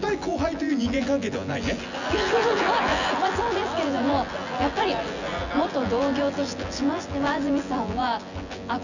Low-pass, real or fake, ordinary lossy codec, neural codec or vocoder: 7.2 kHz; fake; none; vocoder, 24 kHz, 100 mel bands, Vocos